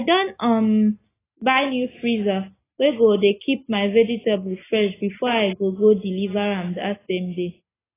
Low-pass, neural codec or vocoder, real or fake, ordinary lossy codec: 3.6 kHz; none; real; AAC, 16 kbps